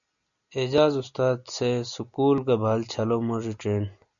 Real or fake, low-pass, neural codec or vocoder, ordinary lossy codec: real; 7.2 kHz; none; MP3, 96 kbps